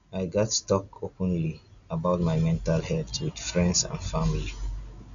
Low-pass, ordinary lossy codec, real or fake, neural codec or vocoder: 7.2 kHz; MP3, 96 kbps; real; none